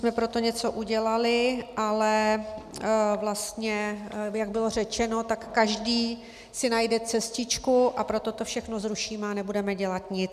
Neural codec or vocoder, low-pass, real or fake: none; 14.4 kHz; real